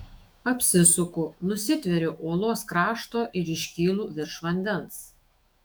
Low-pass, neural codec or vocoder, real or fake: 19.8 kHz; autoencoder, 48 kHz, 128 numbers a frame, DAC-VAE, trained on Japanese speech; fake